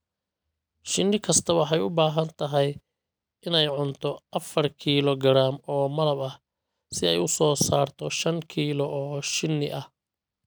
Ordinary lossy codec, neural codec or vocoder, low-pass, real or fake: none; none; none; real